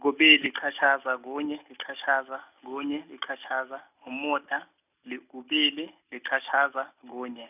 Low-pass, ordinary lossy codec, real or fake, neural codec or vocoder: 3.6 kHz; none; real; none